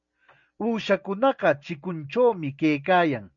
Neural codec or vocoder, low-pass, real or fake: none; 7.2 kHz; real